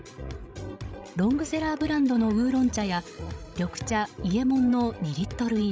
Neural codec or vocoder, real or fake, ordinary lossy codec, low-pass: codec, 16 kHz, 16 kbps, FreqCodec, larger model; fake; none; none